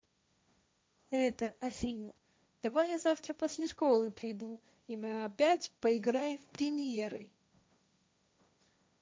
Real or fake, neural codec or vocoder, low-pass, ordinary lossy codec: fake; codec, 16 kHz, 1.1 kbps, Voila-Tokenizer; none; none